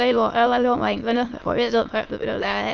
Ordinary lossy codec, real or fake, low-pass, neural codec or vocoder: Opus, 32 kbps; fake; 7.2 kHz; autoencoder, 22.05 kHz, a latent of 192 numbers a frame, VITS, trained on many speakers